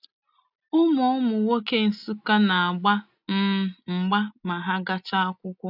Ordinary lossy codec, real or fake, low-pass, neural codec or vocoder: none; real; 5.4 kHz; none